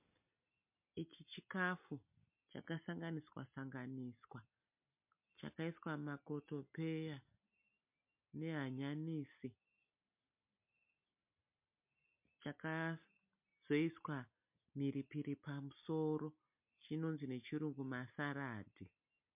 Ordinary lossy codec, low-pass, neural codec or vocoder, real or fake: MP3, 32 kbps; 3.6 kHz; none; real